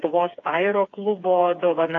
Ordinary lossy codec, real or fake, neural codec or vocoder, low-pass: AAC, 48 kbps; fake; codec, 16 kHz, 4 kbps, FreqCodec, smaller model; 7.2 kHz